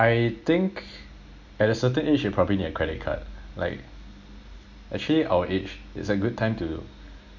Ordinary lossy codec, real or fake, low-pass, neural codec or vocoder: MP3, 48 kbps; real; 7.2 kHz; none